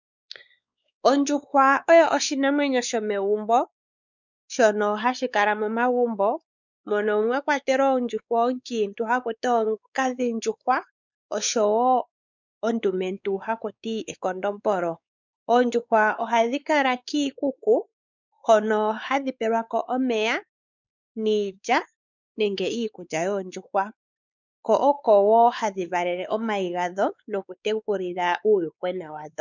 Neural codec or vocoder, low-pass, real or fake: codec, 16 kHz, 4 kbps, X-Codec, WavLM features, trained on Multilingual LibriSpeech; 7.2 kHz; fake